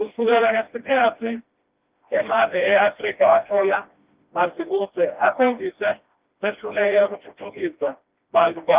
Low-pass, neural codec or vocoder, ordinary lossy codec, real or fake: 3.6 kHz; codec, 16 kHz, 1 kbps, FreqCodec, smaller model; Opus, 32 kbps; fake